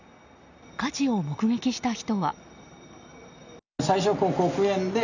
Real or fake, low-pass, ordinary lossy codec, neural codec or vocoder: real; 7.2 kHz; none; none